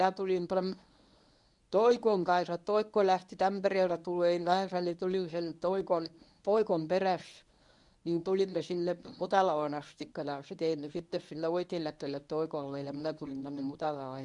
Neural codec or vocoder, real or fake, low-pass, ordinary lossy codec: codec, 24 kHz, 0.9 kbps, WavTokenizer, medium speech release version 1; fake; 10.8 kHz; none